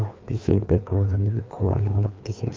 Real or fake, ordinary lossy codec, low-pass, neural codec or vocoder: fake; Opus, 24 kbps; 7.2 kHz; codec, 16 kHz, 2 kbps, FreqCodec, larger model